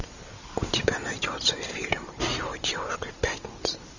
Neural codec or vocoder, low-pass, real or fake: none; 7.2 kHz; real